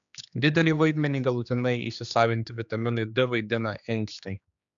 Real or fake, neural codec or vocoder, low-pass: fake; codec, 16 kHz, 2 kbps, X-Codec, HuBERT features, trained on general audio; 7.2 kHz